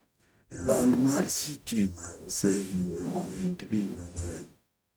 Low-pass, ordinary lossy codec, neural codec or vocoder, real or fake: none; none; codec, 44.1 kHz, 0.9 kbps, DAC; fake